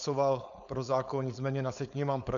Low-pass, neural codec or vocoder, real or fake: 7.2 kHz; codec, 16 kHz, 4.8 kbps, FACodec; fake